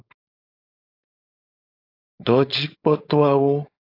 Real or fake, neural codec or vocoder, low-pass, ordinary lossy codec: fake; codec, 16 kHz, 4.8 kbps, FACodec; 5.4 kHz; AAC, 32 kbps